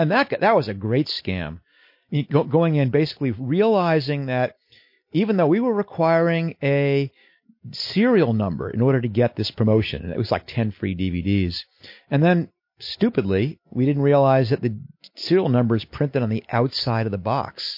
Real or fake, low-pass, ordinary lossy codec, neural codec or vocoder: real; 5.4 kHz; MP3, 32 kbps; none